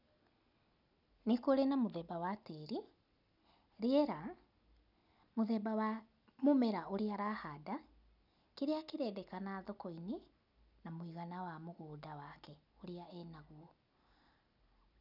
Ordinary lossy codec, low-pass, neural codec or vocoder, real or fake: none; 5.4 kHz; none; real